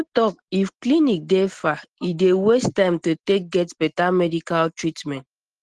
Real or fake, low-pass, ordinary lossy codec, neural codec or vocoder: real; 10.8 kHz; Opus, 16 kbps; none